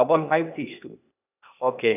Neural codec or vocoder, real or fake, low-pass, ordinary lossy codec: codec, 16 kHz, 0.8 kbps, ZipCodec; fake; 3.6 kHz; none